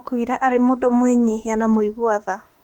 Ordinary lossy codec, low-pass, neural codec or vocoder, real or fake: Opus, 32 kbps; 19.8 kHz; autoencoder, 48 kHz, 32 numbers a frame, DAC-VAE, trained on Japanese speech; fake